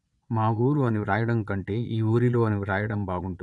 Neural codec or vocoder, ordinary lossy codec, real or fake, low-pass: vocoder, 22.05 kHz, 80 mel bands, Vocos; none; fake; none